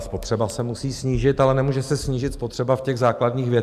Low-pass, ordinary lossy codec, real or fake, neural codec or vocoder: 14.4 kHz; MP3, 96 kbps; real; none